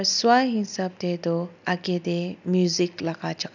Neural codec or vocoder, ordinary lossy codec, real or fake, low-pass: none; none; real; 7.2 kHz